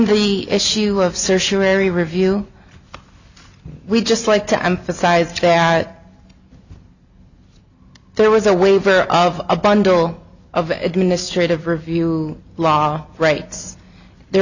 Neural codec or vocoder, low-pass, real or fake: none; 7.2 kHz; real